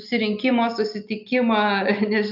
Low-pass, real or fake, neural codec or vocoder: 5.4 kHz; real; none